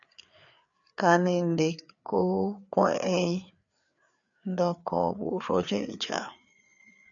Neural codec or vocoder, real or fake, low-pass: codec, 16 kHz, 4 kbps, FreqCodec, larger model; fake; 7.2 kHz